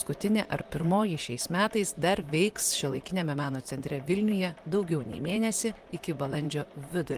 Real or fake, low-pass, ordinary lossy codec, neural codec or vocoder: fake; 14.4 kHz; Opus, 32 kbps; vocoder, 44.1 kHz, 128 mel bands, Pupu-Vocoder